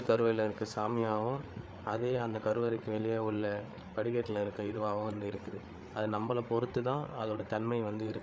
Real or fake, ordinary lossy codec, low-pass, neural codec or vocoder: fake; none; none; codec, 16 kHz, 8 kbps, FreqCodec, larger model